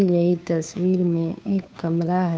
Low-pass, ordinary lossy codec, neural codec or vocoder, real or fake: none; none; codec, 16 kHz, 2 kbps, FunCodec, trained on Chinese and English, 25 frames a second; fake